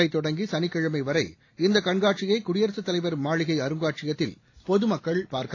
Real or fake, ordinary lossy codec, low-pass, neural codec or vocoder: real; AAC, 32 kbps; 7.2 kHz; none